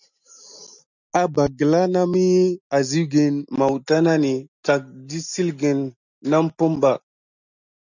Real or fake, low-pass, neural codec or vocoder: real; 7.2 kHz; none